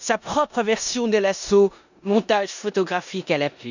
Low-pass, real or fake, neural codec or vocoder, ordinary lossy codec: 7.2 kHz; fake; codec, 16 kHz in and 24 kHz out, 0.9 kbps, LongCat-Audio-Codec, four codebook decoder; none